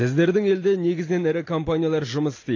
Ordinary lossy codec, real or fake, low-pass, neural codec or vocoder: AAC, 32 kbps; real; 7.2 kHz; none